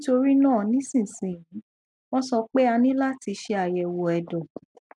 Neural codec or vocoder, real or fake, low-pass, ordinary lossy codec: none; real; 10.8 kHz; none